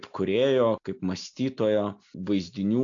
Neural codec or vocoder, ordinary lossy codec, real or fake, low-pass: none; AAC, 64 kbps; real; 7.2 kHz